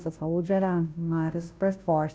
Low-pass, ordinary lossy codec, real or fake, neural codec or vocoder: none; none; fake; codec, 16 kHz, 0.5 kbps, FunCodec, trained on Chinese and English, 25 frames a second